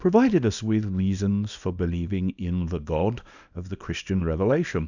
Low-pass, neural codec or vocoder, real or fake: 7.2 kHz; codec, 24 kHz, 0.9 kbps, WavTokenizer, small release; fake